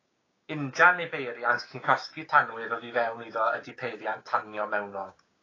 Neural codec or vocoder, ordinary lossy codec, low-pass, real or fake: codec, 16 kHz, 6 kbps, DAC; AAC, 32 kbps; 7.2 kHz; fake